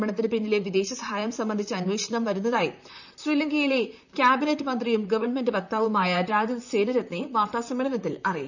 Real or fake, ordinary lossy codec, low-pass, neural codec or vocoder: fake; none; 7.2 kHz; vocoder, 44.1 kHz, 128 mel bands, Pupu-Vocoder